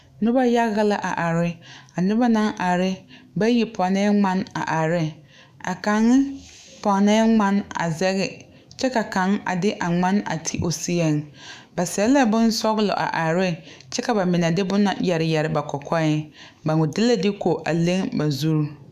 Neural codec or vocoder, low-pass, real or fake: autoencoder, 48 kHz, 128 numbers a frame, DAC-VAE, trained on Japanese speech; 14.4 kHz; fake